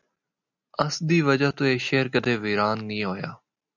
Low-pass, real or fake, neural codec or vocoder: 7.2 kHz; real; none